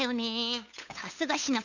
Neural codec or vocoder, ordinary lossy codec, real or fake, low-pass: codec, 16 kHz, 8 kbps, FunCodec, trained on LibriTTS, 25 frames a second; none; fake; 7.2 kHz